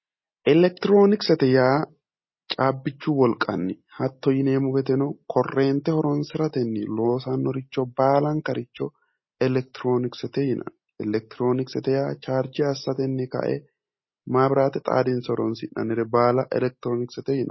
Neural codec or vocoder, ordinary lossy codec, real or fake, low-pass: none; MP3, 24 kbps; real; 7.2 kHz